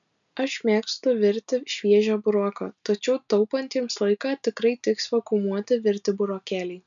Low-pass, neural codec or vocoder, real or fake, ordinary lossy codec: 7.2 kHz; none; real; MP3, 96 kbps